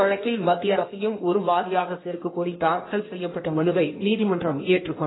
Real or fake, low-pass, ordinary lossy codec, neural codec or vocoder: fake; 7.2 kHz; AAC, 16 kbps; codec, 16 kHz in and 24 kHz out, 1.1 kbps, FireRedTTS-2 codec